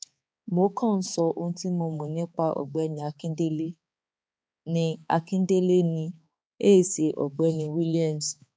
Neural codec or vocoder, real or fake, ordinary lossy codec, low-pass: codec, 16 kHz, 4 kbps, X-Codec, HuBERT features, trained on balanced general audio; fake; none; none